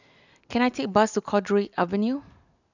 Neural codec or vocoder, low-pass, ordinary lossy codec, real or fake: none; 7.2 kHz; none; real